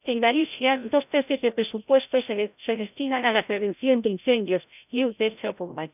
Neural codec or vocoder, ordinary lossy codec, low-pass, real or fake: codec, 16 kHz, 0.5 kbps, FreqCodec, larger model; none; 3.6 kHz; fake